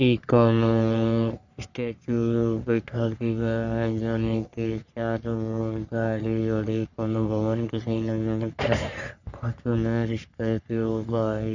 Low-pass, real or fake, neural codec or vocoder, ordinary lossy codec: 7.2 kHz; fake; codec, 44.1 kHz, 3.4 kbps, Pupu-Codec; none